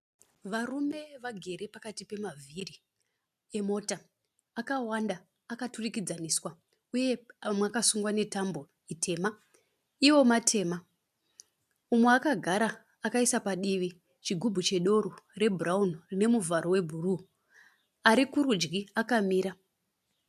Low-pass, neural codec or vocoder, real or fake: 14.4 kHz; none; real